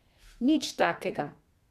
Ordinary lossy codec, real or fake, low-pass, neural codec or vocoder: none; fake; 14.4 kHz; codec, 32 kHz, 1.9 kbps, SNAC